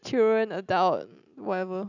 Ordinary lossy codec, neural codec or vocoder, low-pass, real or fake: none; none; 7.2 kHz; real